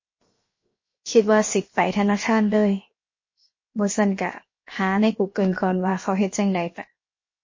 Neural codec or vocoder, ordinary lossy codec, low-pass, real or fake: codec, 16 kHz, 0.7 kbps, FocalCodec; MP3, 32 kbps; 7.2 kHz; fake